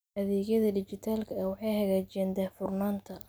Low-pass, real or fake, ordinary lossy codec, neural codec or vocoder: none; real; none; none